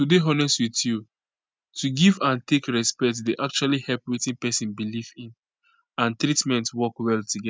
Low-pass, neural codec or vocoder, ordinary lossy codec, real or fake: none; none; none; real